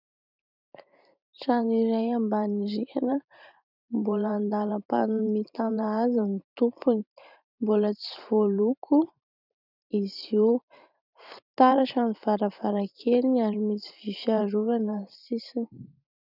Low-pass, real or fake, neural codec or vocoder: 5.4 kHz; fake; vocoder, 44.1 kHz, 128 mel bands every 512 samples, BigVGAN v2